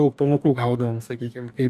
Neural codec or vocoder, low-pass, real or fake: codec, 44.1 kHz, 2.6 kbps, DAC; 14.4 kHz; fake